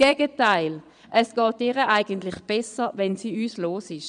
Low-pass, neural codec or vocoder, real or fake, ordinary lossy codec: 9.9 kHz; vocoder, 22.05 kHz, 80 mel bands, Vocos; fake; none